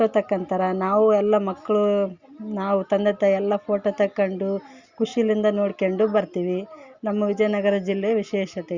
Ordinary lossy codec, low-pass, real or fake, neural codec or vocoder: Opus, 64 kbps; 7.2 kHz; real; none